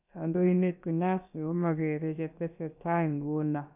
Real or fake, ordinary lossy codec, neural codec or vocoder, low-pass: fake; none; codec, 16 kHz, about 1 kbps, DyCAST, with the encoder's durations; 3.6 kHz